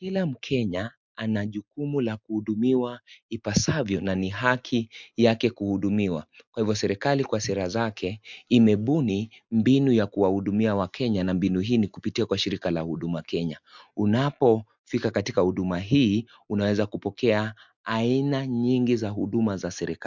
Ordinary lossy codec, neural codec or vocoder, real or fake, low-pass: MP3, 64 kbps; none; real; 7.2 kHz